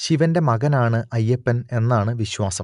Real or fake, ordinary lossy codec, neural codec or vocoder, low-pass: real; none; none; 10.8 kHz